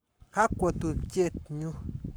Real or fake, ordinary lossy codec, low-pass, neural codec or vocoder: fake; none; none; codec, 44.1 kHz, 7.8 kbps, Pupu-Codec